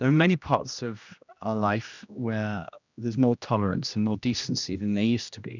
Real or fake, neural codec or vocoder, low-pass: fake; codec, 16 kHz, 1 kbps, X-Codec, HuBERT features, trained on general audio; 7.2 kHz